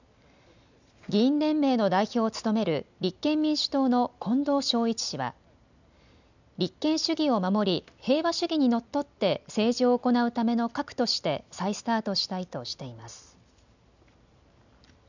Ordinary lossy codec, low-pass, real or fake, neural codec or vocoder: none; 7.2 kHz; real; none